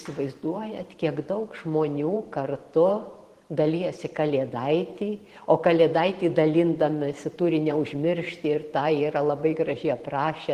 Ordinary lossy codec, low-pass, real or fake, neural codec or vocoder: Opus, 16 kbps; 14.4 kHz; fake; vocoder, 44.1 kHz, 128 mel bands every 512 samples, BigVGAN v2